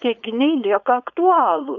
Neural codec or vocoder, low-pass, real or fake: codec, 16 kHz, 4.8 kbps, FACodec; 7.2 kHz; fake